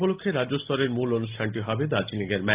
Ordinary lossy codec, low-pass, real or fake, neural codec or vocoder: Opus, 32 kbps; 3.6 kHz; real; none